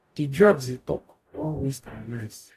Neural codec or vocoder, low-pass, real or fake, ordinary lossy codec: codec, 44.1 kHz, 0.9 kbps, DAC; 14.4 kHz; fake; AAC, 64 kbps